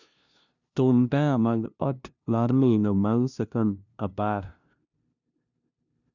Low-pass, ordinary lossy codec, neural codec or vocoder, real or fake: 7.2 kHz; none; codec, 16 kHz, 0.5 kbps, FunCodec, trained on LibriTTS, 25 frames a second; fake